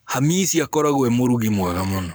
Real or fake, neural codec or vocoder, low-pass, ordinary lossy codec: fake; codec, 44.1 kHz, 7.8 kbps, Pupu-Codec; none; none